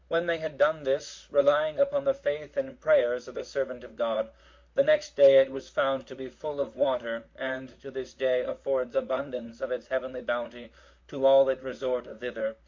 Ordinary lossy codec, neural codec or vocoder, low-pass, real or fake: MP3, 48 kbps; vocoder, 44.1 kHz, 128 mel bands, Pupu-Vocoder; 7.2 kHz; fake